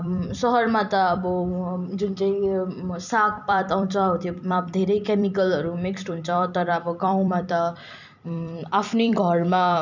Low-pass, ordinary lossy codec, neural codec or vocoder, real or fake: 7.2 kHz; none; vocoder, 44.1 kHz, 128 mel bands every 256 samples, BigVGAN v2; fake